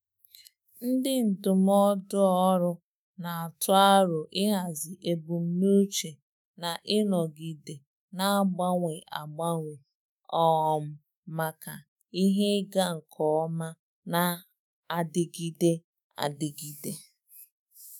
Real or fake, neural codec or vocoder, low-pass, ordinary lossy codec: fake; autoencoder, 48 kHz, 128 numbers a frame, DAC-VAE, trained on Japanese speech; none; none